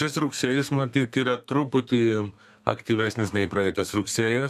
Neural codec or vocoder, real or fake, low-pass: codec, 32 kHz, 1.9 kbps, SNAC; fake; 14.4 kHz